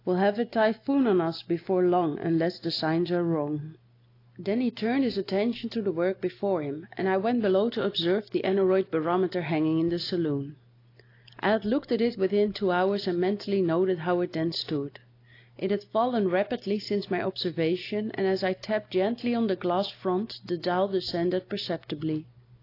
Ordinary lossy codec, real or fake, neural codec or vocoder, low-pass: AAC, 32 kbps; real; none; 5.4 kHz